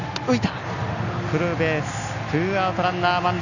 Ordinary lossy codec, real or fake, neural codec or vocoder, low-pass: none; real; none; 7.2 kHz